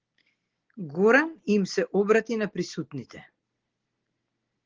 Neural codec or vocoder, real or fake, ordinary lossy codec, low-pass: none; real; Opus, 16 kbps; 7.2 kHz